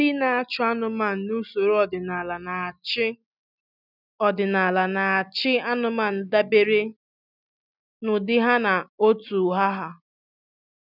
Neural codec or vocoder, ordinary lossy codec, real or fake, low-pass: none; none; real; 5.4 kHz